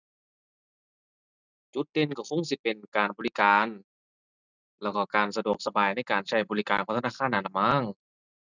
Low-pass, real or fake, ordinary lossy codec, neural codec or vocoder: 7.2 kHz; real; none; none